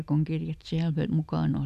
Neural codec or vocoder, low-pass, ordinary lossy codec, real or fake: none; 14.4 kHz; none; real